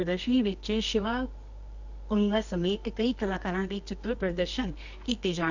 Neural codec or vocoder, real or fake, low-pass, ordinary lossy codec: codec, 24 kHz, 0.9 kbps, WavTokenizer, medium music audio release; fake; 7.2 kHz; none